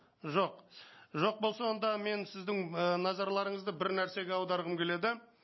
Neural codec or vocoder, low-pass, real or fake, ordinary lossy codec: none; 7.2 kHz; real; MP3, 24 kbps